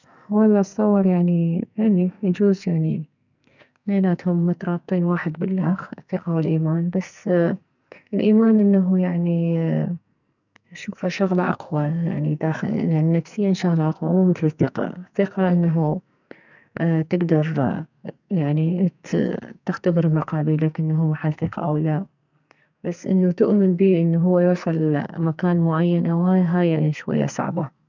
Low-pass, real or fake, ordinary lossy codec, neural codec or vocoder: 7.2 kHz; fake; none; codec, 44.1 kHz, 2.6 kbps, SNAC